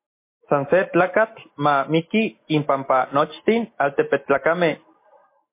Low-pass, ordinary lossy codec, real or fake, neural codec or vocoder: 3.6 kHz; MP3, 24 kbps; real; none